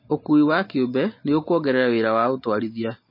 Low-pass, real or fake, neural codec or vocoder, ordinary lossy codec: 5.4 kHz; real; none; MP3, 24 kbps